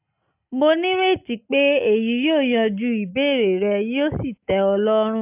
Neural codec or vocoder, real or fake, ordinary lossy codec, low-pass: none; real; AAC, 32 kbps; 3.6 kHz